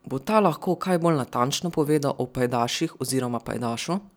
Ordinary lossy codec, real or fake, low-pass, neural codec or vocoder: none; real; none; none